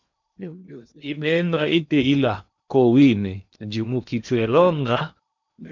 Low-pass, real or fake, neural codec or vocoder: 7.2 kHz; fake; codec, 16 kHz in and 24 kHz out, 0.8 kbps, FocalCodec, streaming, 65536 codes